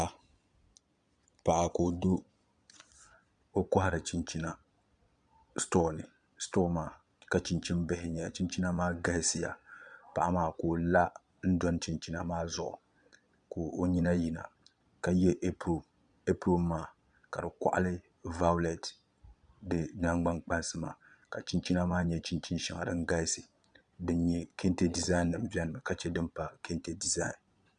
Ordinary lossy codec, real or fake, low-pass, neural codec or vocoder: Opus, 64 kbps; fake; 9.9 kHz; vocoder, 22.05 kHz, 80 mel bands, Vocos